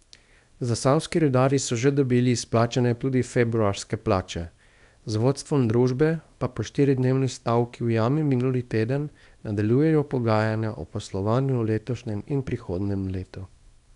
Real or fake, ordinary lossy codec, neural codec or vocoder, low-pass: fake; none; codec, 24 kHz, 0.9 kbps, WavTokenizer, small release; 10.8 kHz